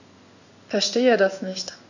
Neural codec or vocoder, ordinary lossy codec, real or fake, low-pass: codec, 16 kHz in and 24 kHz out, 1 kbps, XY-Tokenizer; none; fake; 7.2 kHz